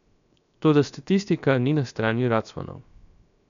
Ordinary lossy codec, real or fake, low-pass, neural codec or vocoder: none; fake; 7.2 kHz; codec, 16 kHz, 0.7 kbps, FocalCodec